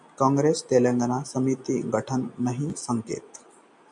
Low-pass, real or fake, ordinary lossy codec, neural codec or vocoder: 10.8 kHz; real; MP3, 64 kbps; none